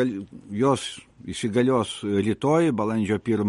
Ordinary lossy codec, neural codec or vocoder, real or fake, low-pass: MP3, 48 kbps; none; real; 19.8 kHz